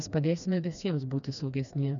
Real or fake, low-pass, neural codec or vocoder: fake; 7.2 kHz; codec, 16 kHz, 2 kbps, FreqCodec, smaller model